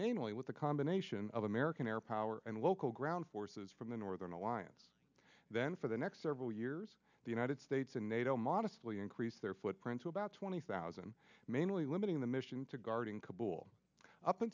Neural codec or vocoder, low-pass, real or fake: none; 7.2 kHz; real